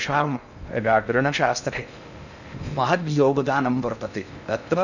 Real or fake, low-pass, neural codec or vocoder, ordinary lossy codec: fake; 7.2 kHz; codec, 16 kHz in and 24 kHz out, 0.6 kbps, FocalCodec, streaming, 2048 codes; none